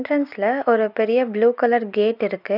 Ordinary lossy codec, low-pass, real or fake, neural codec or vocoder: none; 5.4 kHz; real; none